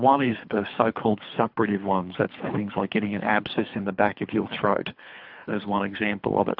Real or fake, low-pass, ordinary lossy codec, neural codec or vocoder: fake; 5.4 kHz; AAC, 48 kbps; codec, 24 kHz, 3 kbps, HILCodec